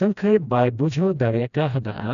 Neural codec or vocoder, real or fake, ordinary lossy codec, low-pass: codec, 16 kHz, 1 kbps, FreqCodec, smaller model; fake; none; 7.2 kHz